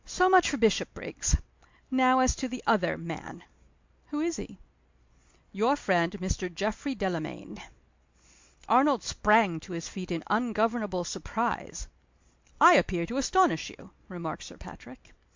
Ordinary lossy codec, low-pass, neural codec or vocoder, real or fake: MP3, 64 kbps; 7.2 kHz; none; real